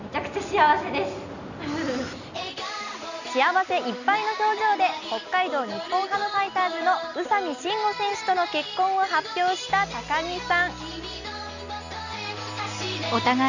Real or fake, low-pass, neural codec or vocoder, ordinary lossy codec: real; 7.2 kHz; none; none